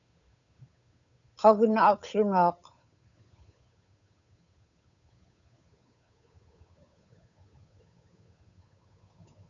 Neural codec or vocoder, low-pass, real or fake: codec, 16 kHz, 8 kbps, FunCodec, trained on Chinese and English, 25 frames a second; 7.2 kHz; fake